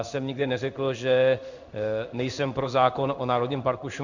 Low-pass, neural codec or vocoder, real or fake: 7.2 kHz; codec, 16 kHz in and 24 kHz out, 1 kbps, XY-Tokenizer; fake